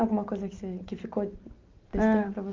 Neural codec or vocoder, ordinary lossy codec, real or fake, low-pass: none; Opus, 32 kbps; real; 7.2 kHz